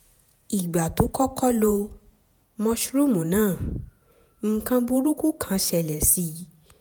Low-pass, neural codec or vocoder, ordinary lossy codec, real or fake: none; vocoder, 48 kHz, 128 mel bands, Vocos; none; fake